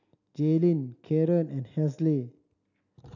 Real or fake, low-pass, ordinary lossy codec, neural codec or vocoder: real; 7.2 kHz; none; none